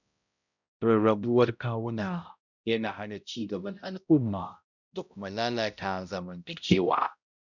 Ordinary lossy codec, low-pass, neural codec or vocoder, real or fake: none; 7.2 kHz; codec, 16 kHz, 0.5 kbps, X-Codec, HuBERT features, trained on balanced general audio; fake